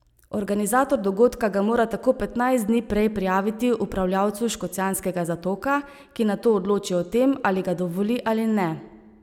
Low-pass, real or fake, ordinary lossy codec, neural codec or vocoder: 19.8 kHz; real; none; none